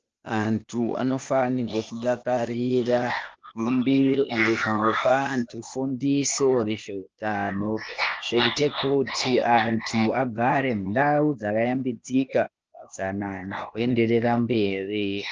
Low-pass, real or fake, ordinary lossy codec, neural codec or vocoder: 7.2 kHz; fake; Opus, 24 kbps; codec, 16 kHz, 0.8 kbps, ZipCodec